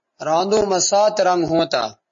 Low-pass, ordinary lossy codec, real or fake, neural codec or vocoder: 7.2 kHz; MP3, 32 kbps; real; none